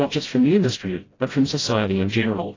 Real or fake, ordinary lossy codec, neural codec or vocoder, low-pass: fake; AAC, 32 kbps; codec, 16 kHz, 0.5 kbps, FreqCodec, smaller model; 7.2 kHz